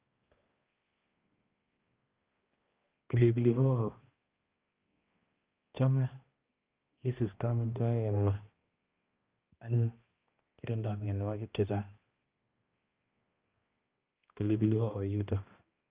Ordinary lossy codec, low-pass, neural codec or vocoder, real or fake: Opus, 24 kbps; 3.6 kHz; codec, 16 kHz, 1 kbps, X-Codec, HuBERT features, trained on balanced general audio; fake